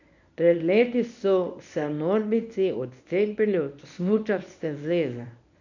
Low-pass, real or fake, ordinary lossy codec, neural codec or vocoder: 7.2 kHz; fake; none; codec, 24 kHz, 0.9 kbps, WavTokenizer, medium speech release version 1